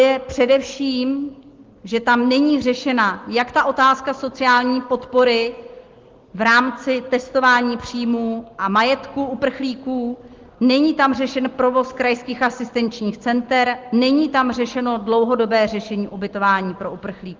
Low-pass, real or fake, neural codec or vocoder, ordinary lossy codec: 7.2 kHz; real; none; Opus, 16 kbps